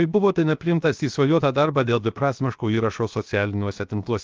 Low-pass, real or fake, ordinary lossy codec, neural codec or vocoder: 7.2 kHz; fake; Opus, 24 kbps; codec, 16 kHz, 0.7 kbps, FocalCodec